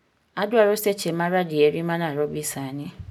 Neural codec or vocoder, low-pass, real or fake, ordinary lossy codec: none; 19.8 kHz; real; none